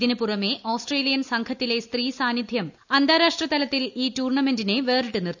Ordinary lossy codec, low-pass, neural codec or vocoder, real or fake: none; 7.2 kHz; none; real